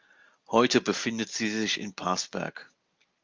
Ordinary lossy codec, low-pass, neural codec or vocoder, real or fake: Opus, 32 kbps; 7.2 kHz; none; real